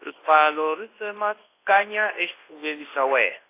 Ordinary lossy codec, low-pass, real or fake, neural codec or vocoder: AAC, 24 kbps; 3.6 kHz; fake; codec, 24 kHz, 0.9 kbps, WavTokenizer, large speech release